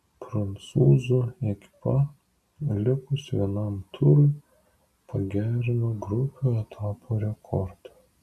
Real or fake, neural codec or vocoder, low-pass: real; none; 14.4 kHz